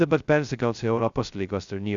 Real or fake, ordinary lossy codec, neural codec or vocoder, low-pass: fake; Opus, 64 kbps; codec, 16 kHz, 0.2 kbps, FocalCodec; 7.2 kHz